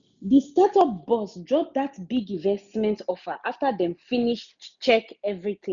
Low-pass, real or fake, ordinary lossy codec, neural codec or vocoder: 7.2 kHz; real; none; none